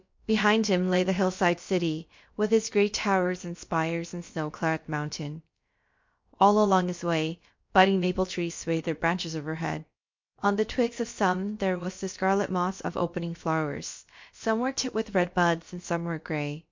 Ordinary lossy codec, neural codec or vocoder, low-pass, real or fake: MP3, 48 kbps; codec, 16 kHz, about 1 kbps, DyCAST, with the encoder's durations; 7.2 kHz; fake